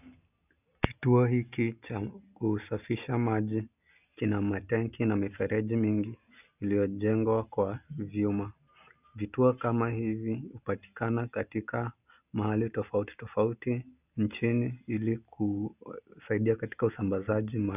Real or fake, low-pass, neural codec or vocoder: real; 3.6 kHz; none